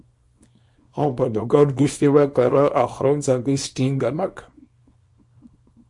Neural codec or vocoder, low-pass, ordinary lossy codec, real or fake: codec, 24 kHz, 0.9 kbps, WavTokenizer, small release; 10.8 kHz; MP3, 48 kbps; fake